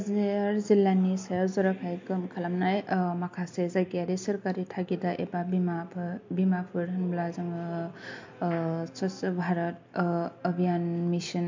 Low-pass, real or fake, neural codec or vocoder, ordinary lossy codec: 7.2 kHz; real; none; MP3, 48 kbps